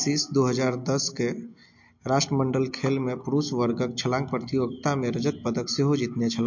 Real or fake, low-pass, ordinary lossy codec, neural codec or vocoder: real; 7.2 kHz; MP3, 48 kbps; none